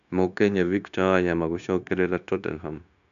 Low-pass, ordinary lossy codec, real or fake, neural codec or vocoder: 7.2 kHz; none; fake; codec, 16 kHz, 0.9 kbps, LongCat-Audio-Codec